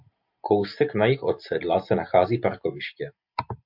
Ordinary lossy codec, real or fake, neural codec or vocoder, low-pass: MP3, 48 kbps; real; none; 5.4 kHz